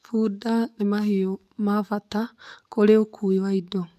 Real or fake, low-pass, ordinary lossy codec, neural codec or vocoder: fake; 14.4 kHz; none; codec, 44.1 kHz, 7.8 kbps, DAC